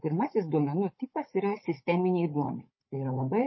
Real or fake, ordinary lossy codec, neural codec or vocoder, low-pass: fake; MP3, 24 kbps; codec, 16 kHz, 16 kbps, FunCodec, trained on Chinese and English, 50 frames a second; 7.2 kHz